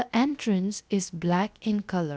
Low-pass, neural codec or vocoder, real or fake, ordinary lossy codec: none; codec, 16 kHz, 0.7 kbps, FocalCodec; fake; none